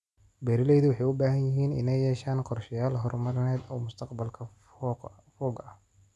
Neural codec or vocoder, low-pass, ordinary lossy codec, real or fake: none; none; none; real